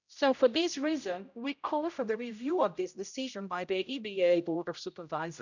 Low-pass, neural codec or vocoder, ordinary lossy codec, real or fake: 7.2 kHz; codec, 16 kHz, 0.5 kbps, X-Codec, HuBERT features, trained on general audio; none; fake